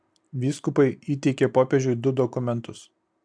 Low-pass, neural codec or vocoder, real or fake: 9.9 kHz; none; real